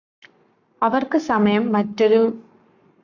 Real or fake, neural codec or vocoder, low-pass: fake; codec, 44.1 kHz, 7.8 kbps, Pupu-Codec; 7.2 kHz